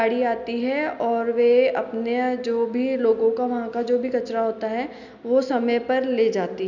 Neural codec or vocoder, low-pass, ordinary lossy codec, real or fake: none; 7.2 kHz; none; real